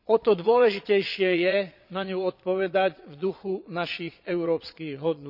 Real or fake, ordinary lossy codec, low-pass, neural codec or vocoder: fake; none; 5.4 kHz; vocoder, 22.05 kHz, 80 mel bands, Vocos